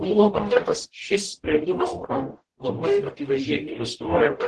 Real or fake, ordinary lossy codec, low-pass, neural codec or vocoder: fake; Opus, 16 kbps; 10.8 kHz; codec, 44.1 kHz, 0.9 kbps, DAC